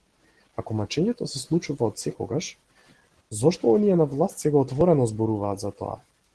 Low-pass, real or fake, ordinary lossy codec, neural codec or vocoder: 10.8 kHz; real; Opus, 16 kbps; none